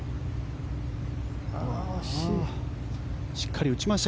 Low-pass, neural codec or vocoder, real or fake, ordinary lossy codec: none; none; real; none